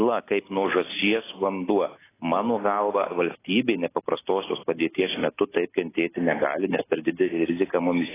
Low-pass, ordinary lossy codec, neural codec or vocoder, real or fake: 3.6 kHz; AAC, 16 kbps; none; real